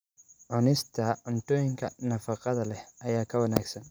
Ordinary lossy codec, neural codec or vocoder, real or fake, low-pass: none; none; real; none